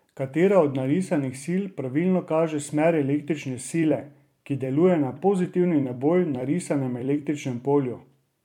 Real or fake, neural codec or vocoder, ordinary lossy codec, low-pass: fake; vocoder, 44.1 kHz, 128 mel bands every 256 samples, BigVGAN v2; MP3, 96 kbps; 19.8 kHz